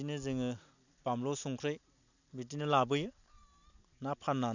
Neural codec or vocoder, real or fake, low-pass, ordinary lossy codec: none; real; 7.2 kHz; none